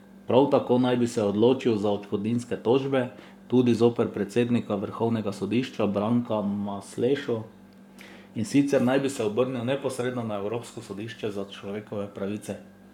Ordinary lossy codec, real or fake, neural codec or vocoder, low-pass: none; fake; codec, 44.1 kHz, 7.8 kbps, Pupu-Codec; 19.8 kHz